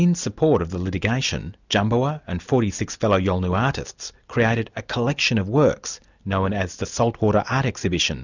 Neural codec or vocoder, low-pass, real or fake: none; 7.2 kHz; real